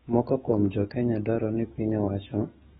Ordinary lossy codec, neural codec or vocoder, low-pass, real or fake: AAC, 16 kbps; autoencoder, 48 kHz, 128 numbers a frame, DAC-VAE, trained on Japanese speech; 19.8 kHz; fake